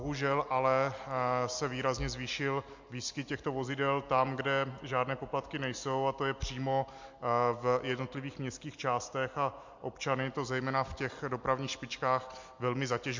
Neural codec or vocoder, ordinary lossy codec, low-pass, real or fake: none; MP3, 48 kbps; 7.2 kHz; real